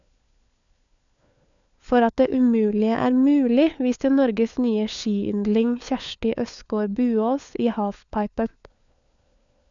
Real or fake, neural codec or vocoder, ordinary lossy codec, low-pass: fake; codec, 16 kHz, 4 kbps, FunCodec, trained on LibriTTS, 50 frames a second; none; 7.2 kHz